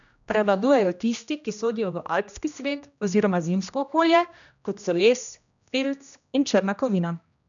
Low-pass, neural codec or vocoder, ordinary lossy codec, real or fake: 7.2 kHz; codec, 16 kHz, 1 kbps, X-Codec, HuBERT features, trained on general audio; none; fake